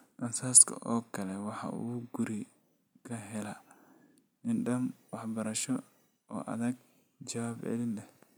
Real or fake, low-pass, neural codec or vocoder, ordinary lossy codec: real; none; none; none